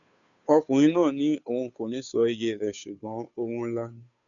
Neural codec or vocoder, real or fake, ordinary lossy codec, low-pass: codec, 16 kHz, 2 kbps, FunCodec, trained on Chinese and English, 25 frames a second; fake; none; 7.2 kHz